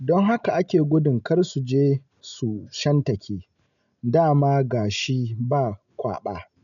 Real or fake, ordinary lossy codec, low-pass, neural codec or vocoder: real; none; 7.2 kHz; none